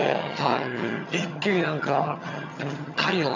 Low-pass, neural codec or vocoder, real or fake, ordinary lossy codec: 7.2 kHz; vocoder, 22.05 kHz, 80 mel bands, HiFi-GAN; fake; none